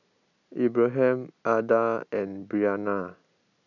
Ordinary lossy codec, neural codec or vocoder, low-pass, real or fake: none; none; 7.2 kHz; real